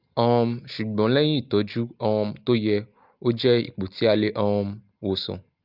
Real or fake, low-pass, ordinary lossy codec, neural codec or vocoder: real; 5.4 kHz; Opus, 24 kbps; none